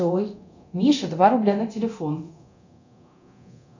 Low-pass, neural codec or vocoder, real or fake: 7.2 kHz; codec, 24 kHz, 0.9 kbps, DualCodec; fake